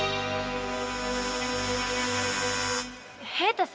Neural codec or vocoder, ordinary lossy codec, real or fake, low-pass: none; none; real; none